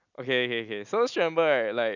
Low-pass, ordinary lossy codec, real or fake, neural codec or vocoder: 7.2 kHz; none; real; none